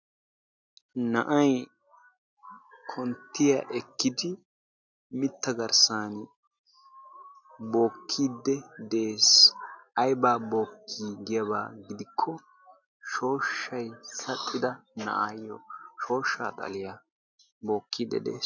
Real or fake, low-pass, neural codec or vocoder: real; 7.2 kHz; none